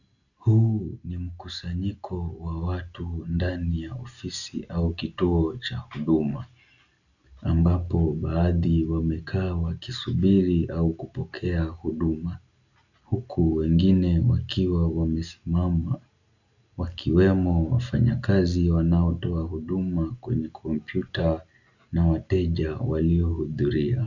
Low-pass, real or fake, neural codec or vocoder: 7.2 kHz; real; none